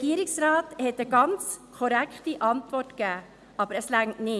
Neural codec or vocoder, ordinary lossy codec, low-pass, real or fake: none; none; none; real